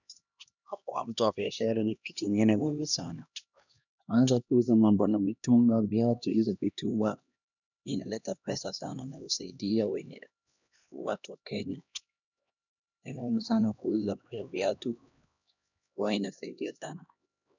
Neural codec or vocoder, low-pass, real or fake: codec, 16 kHz, 1 kbps, X-Codec, HuBERT features, trained on LibriSpeech; 7.2 kHz; fake